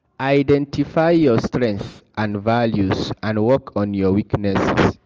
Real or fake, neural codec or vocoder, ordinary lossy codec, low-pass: real; none; Opus, 24 kbps; 7.2 kHz